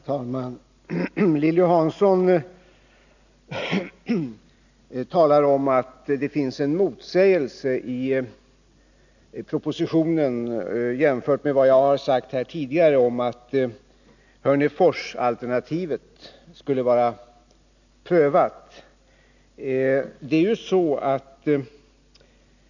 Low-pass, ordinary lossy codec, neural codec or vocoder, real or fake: 7.2 kHz; none; none; real